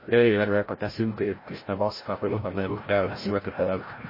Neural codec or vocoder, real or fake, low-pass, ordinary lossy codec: codec, 16 kHz, 0.5 kbps, FreqCodec, larger model; fake; 5.4 kHz; MP3, 24 kbps